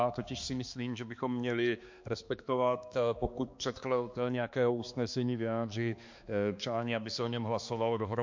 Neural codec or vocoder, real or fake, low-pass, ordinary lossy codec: codec, 16 kHz, 2 kbps, X-Codec, HuBERT features, trained on balanced general audio; fake; 7.2 kHz; MP3, 48 kbps